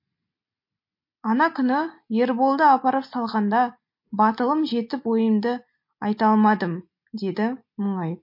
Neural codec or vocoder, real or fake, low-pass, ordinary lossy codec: none; real; 5.4 kHz; MP3, 32 kbps